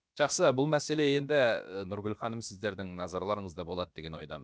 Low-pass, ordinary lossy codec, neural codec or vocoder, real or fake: none; none; codec, 16 kHz, about 1 kbps, DyCAST, with the encoder's durations; fake